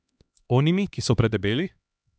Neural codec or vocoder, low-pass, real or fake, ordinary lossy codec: codec, 16 kHz, 2 kbps, X-Codec, HuBERT features, trained on LibriSpeech; none; fake; none